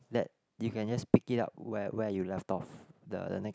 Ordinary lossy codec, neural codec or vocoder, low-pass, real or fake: none; none; none; real